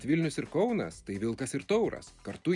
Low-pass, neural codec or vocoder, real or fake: 10.8 kHz; none; real